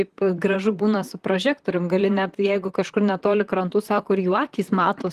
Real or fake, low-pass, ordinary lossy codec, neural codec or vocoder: fake; 14.4 kHz; Opus, 24 kbps; vocoder, 44.1 kHz, 128 mel bands, Pupu-Vocoder